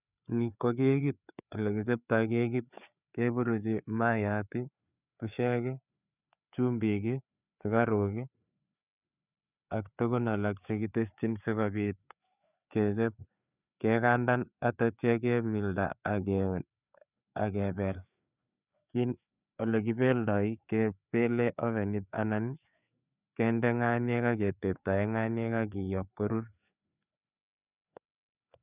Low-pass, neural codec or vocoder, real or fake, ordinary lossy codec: 3.6 kHz; codec, 16 kHz, 4 kbps, FreqCodec, larger model; fake; none